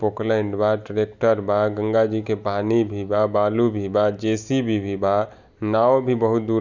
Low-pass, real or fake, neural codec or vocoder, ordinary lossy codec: 7.2 kHz; real; none; none